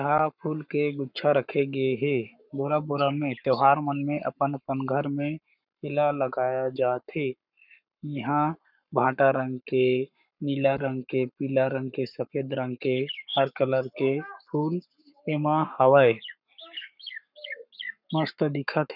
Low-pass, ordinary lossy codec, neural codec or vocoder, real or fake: 5.4 kHz; none; codec, 44.1 kHz, 7.8 kbps, Pupu-Codec; fake